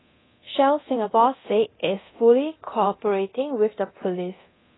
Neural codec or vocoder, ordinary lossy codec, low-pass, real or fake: codec, 24 kHz, 0.9 kbps, DualCodec; AAC, 16 kbps; 7.2 kHz; fake